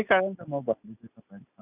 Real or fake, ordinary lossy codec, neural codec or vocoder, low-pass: real; none; none; 3.6 kHz